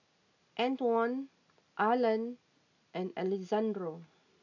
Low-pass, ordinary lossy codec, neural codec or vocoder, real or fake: 7.2 kHz; none; none; real